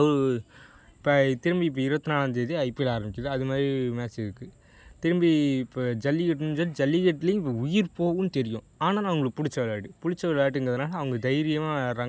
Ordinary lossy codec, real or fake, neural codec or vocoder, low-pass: none; real; none; none